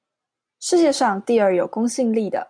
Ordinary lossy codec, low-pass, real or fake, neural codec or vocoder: MP3, 64 kbps; 9.9 kHz; real; none